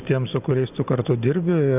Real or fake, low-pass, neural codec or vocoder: real; 3.6 kHz; none